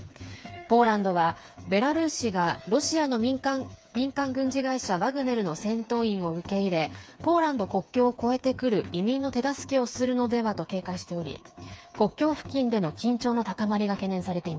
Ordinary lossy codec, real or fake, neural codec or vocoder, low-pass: none; fake; codec, 16 kHz, 4 kbps, FreqCodec, smaller model; none